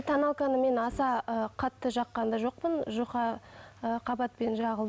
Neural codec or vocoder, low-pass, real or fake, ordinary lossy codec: none; none; real; none